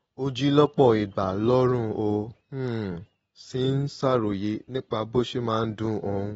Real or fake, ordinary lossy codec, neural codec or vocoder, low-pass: real; AAC, 24 kbps; none; 14.4 kHz